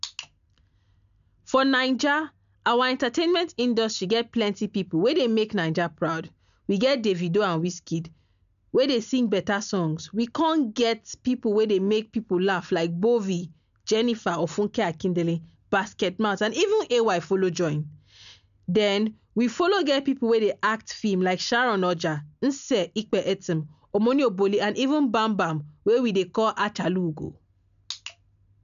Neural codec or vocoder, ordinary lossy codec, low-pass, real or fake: none; none; 7.2 kHz; real